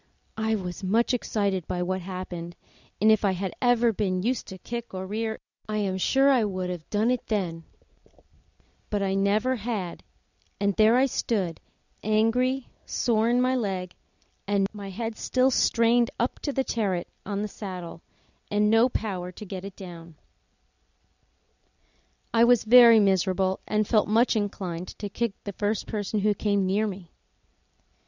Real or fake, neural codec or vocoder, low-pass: real; none; 7.2 kHz